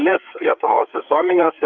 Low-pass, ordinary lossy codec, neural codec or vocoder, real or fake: 7.2 kHz; Opus, 24 kbps; codec, 16 kHz, 4 kbps, FreqCodec, larger model; fake